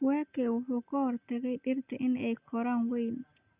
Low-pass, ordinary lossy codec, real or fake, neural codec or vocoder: 3.6 kHz; Opus, 64 kbps; real; none